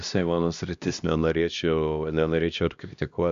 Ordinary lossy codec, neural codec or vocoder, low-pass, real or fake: Opus, 64 kbps; codec, 16 kHz, 1 kbps, X-Codec, HuBERT features, trained on LibriSpeech; 7.2 kHz; fake